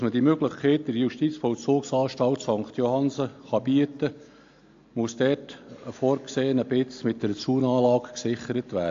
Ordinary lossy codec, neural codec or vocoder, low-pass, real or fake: AAC, 48 kbps; none; 7.2 kHz; real